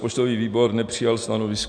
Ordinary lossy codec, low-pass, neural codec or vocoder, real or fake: MP3, 64 kbps; 9.9 kHz; none; real